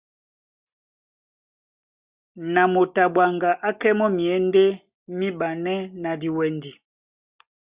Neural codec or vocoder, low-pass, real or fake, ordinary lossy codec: none; 3.6 kHz; real; Opus, 64 kbps